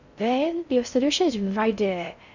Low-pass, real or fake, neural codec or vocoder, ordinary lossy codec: 7.2 kHz; fake; codec, 16 kHz in and 24 kHz out, 0.6 kbps, FocalCodec, streaming, 4096 codes; none